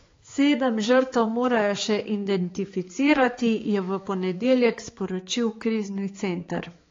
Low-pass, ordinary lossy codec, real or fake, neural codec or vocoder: 7.2 kHz; AAC, 32 kbps; fake; codec, 16 kHz, 4 kbps, X-Codec, HuBERT features, trained on balanced general audio